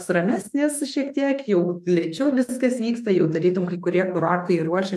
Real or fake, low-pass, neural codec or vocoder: fake; 14.4 kHz; autoencoder, 48 kHz, 32 numbers a frame, DAC-VAE, trained on Japanese speech